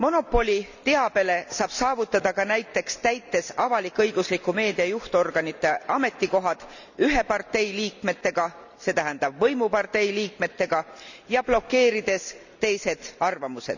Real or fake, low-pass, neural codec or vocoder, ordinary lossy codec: real; 7.2 kHz; none; none